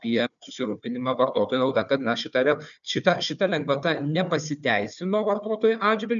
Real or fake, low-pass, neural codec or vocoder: fake; 7.2 kHz; codec, 16 kHz, 4 kbps, FunCodec, trained on Chinese and English, 50 frames a second